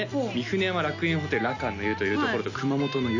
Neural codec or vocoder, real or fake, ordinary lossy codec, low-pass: none; real; AAC, 48 kbps; 7.2 kHz